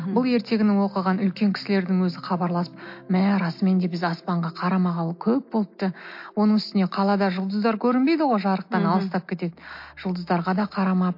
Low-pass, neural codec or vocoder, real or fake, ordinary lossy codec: 5.4 kHz; none; real; MP3, 32 kbps